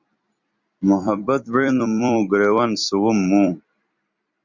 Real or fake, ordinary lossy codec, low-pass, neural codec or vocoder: fake; Opus, 64 kbps; 7.2 kHz; vocoder, 44.1 kHz, 128 mel bands every 256 samples, BigVGAN v2